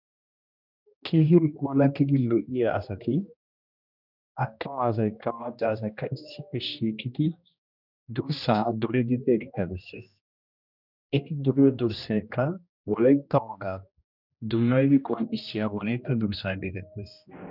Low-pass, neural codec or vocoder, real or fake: 5.4 kHz; codec, 16 kHz, 1 kbps, X-Codec, HuBERT features, trained on general audio; fake